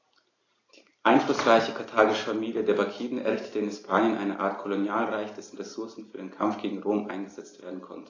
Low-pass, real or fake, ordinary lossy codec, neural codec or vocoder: 7.2 kHz; real; AAC, 32 kbps; none